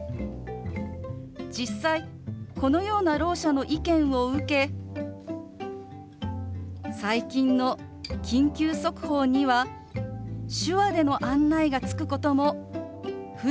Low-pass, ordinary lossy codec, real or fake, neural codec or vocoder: none; none; real; none